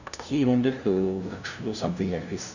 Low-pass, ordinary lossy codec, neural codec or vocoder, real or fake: 7.2 kHz; none; codec, 16 kHz, 0.5 kbps, FunCodec, trained on LibriTTS, 25 frames a second; fake